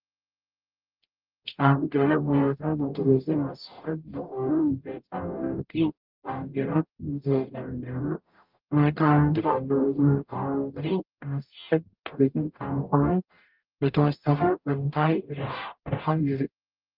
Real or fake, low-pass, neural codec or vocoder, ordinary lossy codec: fake; 5.4 kHz; codec, 44.1 kHz, 0.9 kbps, DAC; Opus, 32 kbps